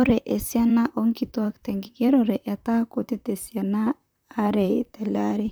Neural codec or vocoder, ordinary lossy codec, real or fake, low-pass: vocoder, 44.1 kHz, 128 mel bands, Pupu-Vocoder; none; fake; none